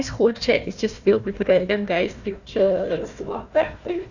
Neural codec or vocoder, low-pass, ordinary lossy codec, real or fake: codec, 16 kHz, 1 kbps, FunCodec, trained on Chinese and English, 50 frames a second; 7.2 kHz; none; fake